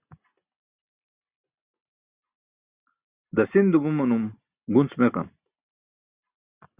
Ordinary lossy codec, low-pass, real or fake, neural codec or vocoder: Opus, 64 kbps; 3.6 kHz; real; none